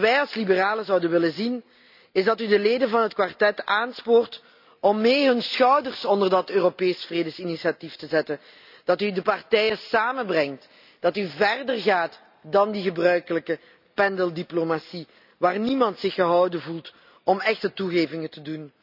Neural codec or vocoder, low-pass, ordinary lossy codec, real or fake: none; 5.4 kHz; none; real